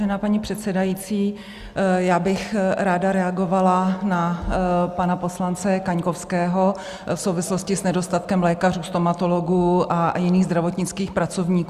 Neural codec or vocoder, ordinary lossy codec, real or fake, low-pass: none; Opus, 64 kbps; real; 14.4 kHz